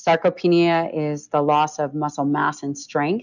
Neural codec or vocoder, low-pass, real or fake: none; 7.2 kHz; real